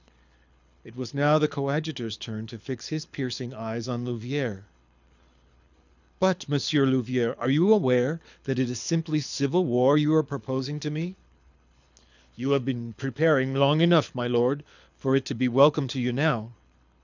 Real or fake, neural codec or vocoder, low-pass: fake; codec, 24 kHz, 6 kbps, HILCodec; 7.2 kHz